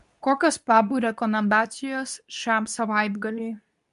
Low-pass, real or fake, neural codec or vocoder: 10.8 kHz; fake; codec, 24 kHz, 0.9 kbps, WavTokenizer, medium speech release version 2